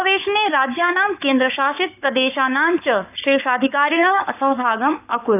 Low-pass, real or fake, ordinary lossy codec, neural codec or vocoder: 3.6 kHz; fake; none; codec, 44.1 kHz, 7.8 kbps, Pupu-Codec